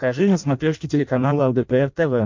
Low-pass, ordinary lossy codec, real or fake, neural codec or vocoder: 7.2 kHz; MP3, 48 kbps; fake; codec, 16 kHz in and 24 kHz out, 0.6 kbps, FireRedTTS-2 codec